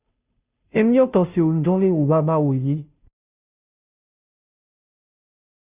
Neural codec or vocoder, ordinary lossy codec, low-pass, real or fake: codec, 16 kHz, 0.5 kbps, FunCodec, trained on Chinese and English, 25 frames a second; Opus, 64 kbps; 3.6 kHz; fake